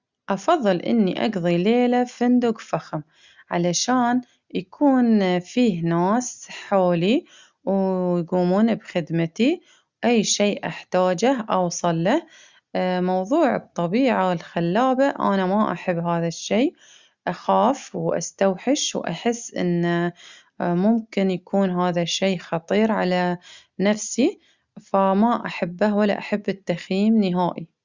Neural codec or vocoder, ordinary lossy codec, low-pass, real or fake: none; Opus, 64 kbps; 7.2 kHz; real